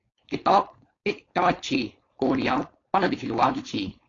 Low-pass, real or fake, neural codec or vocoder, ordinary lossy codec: 7.2 kHz; fake; codec, 16 kHz, 4.8 kbps, FACodec; AAC, 32 kbps